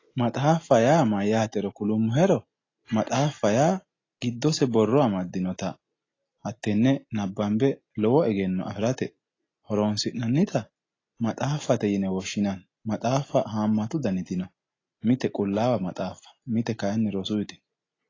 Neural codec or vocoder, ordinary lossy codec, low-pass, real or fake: none; AAC, 32 kbps; 7.2 kHz; real